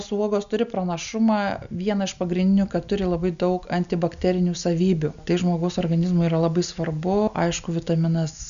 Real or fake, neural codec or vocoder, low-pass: real; none; 7.2 kHz